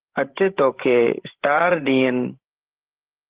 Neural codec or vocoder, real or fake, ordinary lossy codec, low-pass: codec, 16 kHz, 16 kbps, FreqCodec, larger model; fake; Opus, 16 kbps; 3.6 kHz